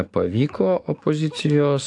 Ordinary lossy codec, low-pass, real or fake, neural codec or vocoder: AAC, 64 kbps; 10.8 kHz; fake; codec, 44.1 kHz, 7.8 kbps, DAC